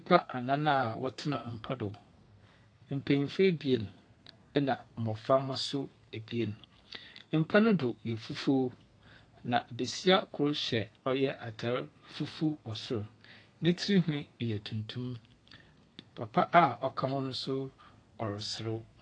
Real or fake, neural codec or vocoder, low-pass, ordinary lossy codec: fake; codec, 32 kHz, 1.9 kbps, SNAC; 9.9 kHz; AAC, 48 kbps